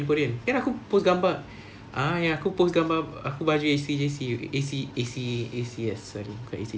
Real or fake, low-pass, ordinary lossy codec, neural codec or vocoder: real; none; none; none